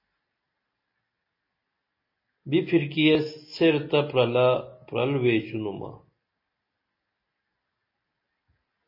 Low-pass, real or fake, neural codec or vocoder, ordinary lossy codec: 5.4 kHz; real; none; MP3, 24 kbps